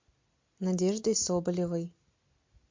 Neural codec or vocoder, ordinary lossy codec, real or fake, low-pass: none; MP3, 48 kbps; real; 7.2 kHz